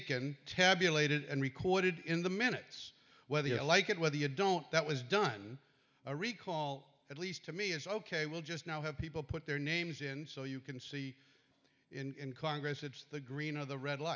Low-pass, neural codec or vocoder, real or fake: 7.2 kHz; none; real